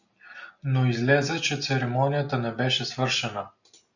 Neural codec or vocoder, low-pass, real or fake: none; 7.2 kHz; real